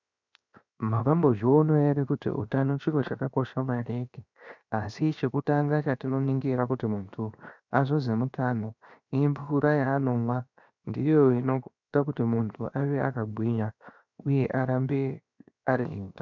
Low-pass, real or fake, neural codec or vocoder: 7.2 kHz; fake; codec, 16 kHz, 0.7 kbps, FocalCodec